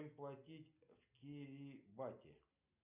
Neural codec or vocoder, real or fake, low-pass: none; real; 3.6 kHz